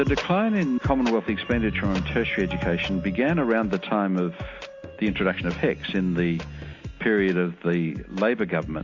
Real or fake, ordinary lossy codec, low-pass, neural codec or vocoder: real; AAC, 48 kbps; 7.2 kHz; none